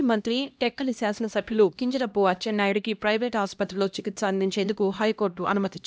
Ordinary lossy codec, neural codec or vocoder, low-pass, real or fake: none; codec, 16 kHz, 1 kbps, X-Codec, HuBERT features, trained on LibriSpeech; none; fake